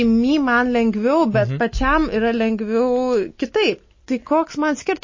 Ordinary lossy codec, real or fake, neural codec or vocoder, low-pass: MP3, 32 kbps; real; none; 7.2 kHz